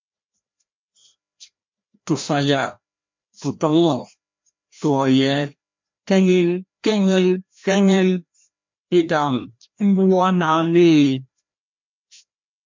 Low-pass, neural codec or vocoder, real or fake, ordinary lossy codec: 7.2 kHz; codec, 16 kHz, 1 kbps, FreqCodec, larger model; fake; MP3, 64 kbps